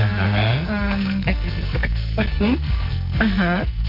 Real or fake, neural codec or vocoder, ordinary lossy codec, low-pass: fake; codec, 44.1 kHz, 2.6 kbps, SNAC; none; 5.4 kHz